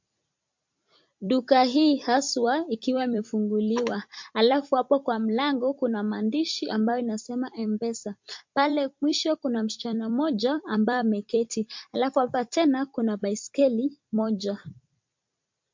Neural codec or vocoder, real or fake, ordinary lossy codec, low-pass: vocoder, 44.1 kHz, 128 mel bands every 256 samples, BigVGAN v2; fake; MP3, 48 kbps; 7.2 kHz